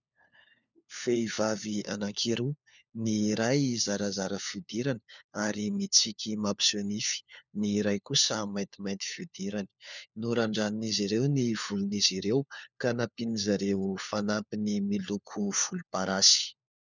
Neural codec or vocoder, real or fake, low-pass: codec, 16 kHz, 4 kbps, FunCodec, trained on LibriTTS, 50 frames a second; fake; 7.2 kHz